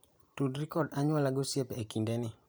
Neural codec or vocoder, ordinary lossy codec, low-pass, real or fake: vocoder, 44.1 kHz, 128 mel bands every 512 samples, BigVGAN v2; none; none; fake